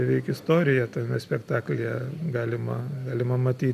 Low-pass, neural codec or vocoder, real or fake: 14.4 kHz; none; real